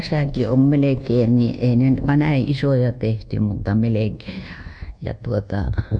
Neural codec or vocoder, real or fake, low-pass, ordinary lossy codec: codec, 24 kHz, 1.2 kbps, DualCodec; fake; 9.9 kHz; none